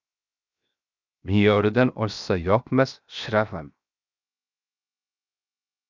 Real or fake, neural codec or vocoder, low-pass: fake; codec, 16 kHz, 0.7 kbps, FocalCodec; 7.2 kHz